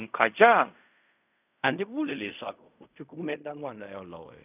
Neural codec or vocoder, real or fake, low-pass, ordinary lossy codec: codec, 16 kHz in and 24 kHz out, 0.4 kbps, LongCat-Audio-Codec, fine tuned four codebook decoder; fake; 3.6 kHz; none